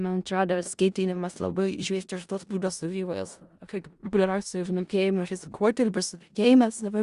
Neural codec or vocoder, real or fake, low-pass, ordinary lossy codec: codec, 16 kHz in and 24 kHz out, 0.4 kbps, LongCat-Audio-Codec, four codebook decoder; fake; 10.8 kHz; Opus, 64 kbps